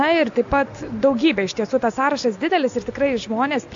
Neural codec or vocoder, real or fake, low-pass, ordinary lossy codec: none; real; 7.2 kHz; AAC, 64 kbps